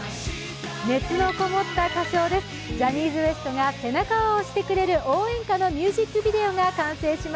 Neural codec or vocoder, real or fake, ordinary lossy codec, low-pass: none; real; none; none